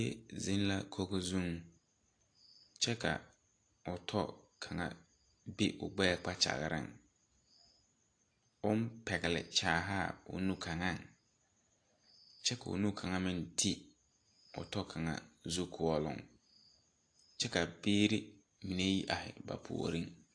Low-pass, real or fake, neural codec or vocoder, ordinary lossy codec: 9.9 kHz; real; none; AAC, 48 kbps